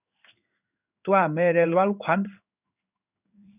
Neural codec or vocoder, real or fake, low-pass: codec, 16 kHz in and 24 kHz out, 1 kbps, XY-Tokenizer; fake; 3.6 kHz